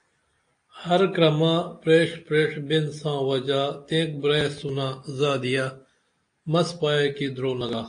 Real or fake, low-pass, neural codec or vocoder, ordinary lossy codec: real; 9.9 kHz; none; AAC, 48 kbps